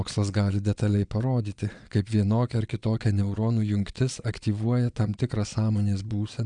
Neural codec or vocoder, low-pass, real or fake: vocoder, 22.05 kHz, 80 mel bands, Vocos; 9.9 kHz; fake